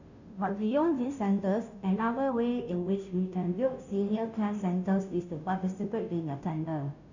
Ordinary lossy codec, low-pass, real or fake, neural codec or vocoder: none; 7.2 kHz; fake; codec, 16 kHz, 0.5 kbps, FunCodec, trained on Chinese and English, 25 frames a second